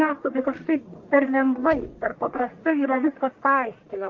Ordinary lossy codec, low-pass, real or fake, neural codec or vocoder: Opus, 24 kbps; 7.2 kHz; fake; codec, 44.1 kHz, 1.7 kbps, Pupu-Codec